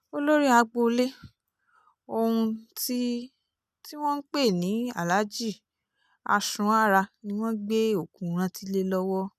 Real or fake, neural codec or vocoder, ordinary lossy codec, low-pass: real; none; none; 14.4 kHz